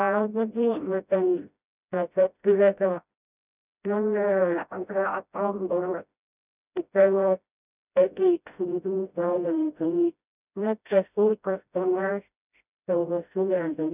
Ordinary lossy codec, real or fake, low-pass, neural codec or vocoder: none; fake; 3.6 kHz; codec, 16 kHz, 0.5 kbps, FreqCodec, smaller model